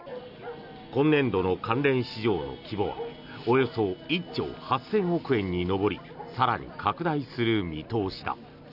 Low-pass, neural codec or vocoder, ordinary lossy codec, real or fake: 5.4 kHz; none; none; real